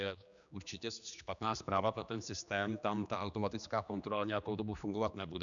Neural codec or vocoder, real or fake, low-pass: codec, 16 kHz, 2 kbps, X-Codec, HuBERT features, trained on general audio; fake; 7.2 kHz